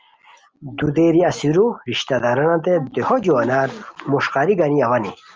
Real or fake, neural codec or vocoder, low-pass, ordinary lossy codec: real; none; 7.2 kHz; Opus, 24 kbps